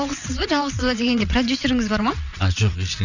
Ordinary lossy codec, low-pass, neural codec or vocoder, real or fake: none; 7.2 kHz; vocoder, 22.05 kHz, 80 mel bands, WaveNeXt; fake